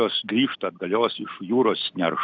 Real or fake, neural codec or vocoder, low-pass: real; none; 7.2 kHz